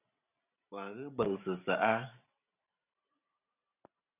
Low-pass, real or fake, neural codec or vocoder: 3.6 kHz; fake; vocoder, 44.1 kHz, 128 mel bands every 512 samples, BigVGAN v2